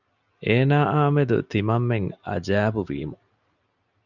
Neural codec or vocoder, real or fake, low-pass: none; real; 7.2 kHz